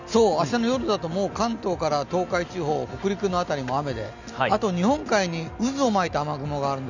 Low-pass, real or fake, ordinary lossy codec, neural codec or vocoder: 7.2 kHz; real; none; none